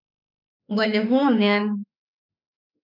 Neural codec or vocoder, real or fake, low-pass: autoencoder, 48 kHz, 32 numbers a frame, DAC-VAE, trained on Japanese speech; fake; 5.4 kHz